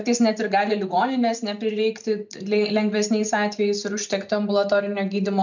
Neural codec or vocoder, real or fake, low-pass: none; real; 7.2 kHz